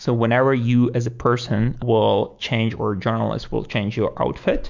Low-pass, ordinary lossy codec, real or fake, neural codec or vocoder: 7.2 kHz; MP3, 64 kbps; fake; autoencoder, 48 kHz, 128 numbers a frame, DAC-VAE, trained on Japanese speech